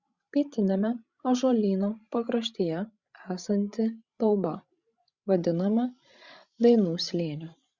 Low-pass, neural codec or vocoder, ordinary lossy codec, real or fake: 7.2 kHz; codec, 16 kHz, 16 kbps, FreqCodec, larger model; Opus, 64 kbps; fake